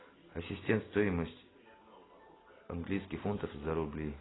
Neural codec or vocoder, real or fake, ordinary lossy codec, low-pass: none; real; AAC, 16 kbps; 7.2 kHz